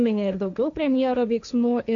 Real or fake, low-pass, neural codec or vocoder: fake; 7.2 kHz; codec, 16 kHz, 1.1 kbps, Voila-Tokenizer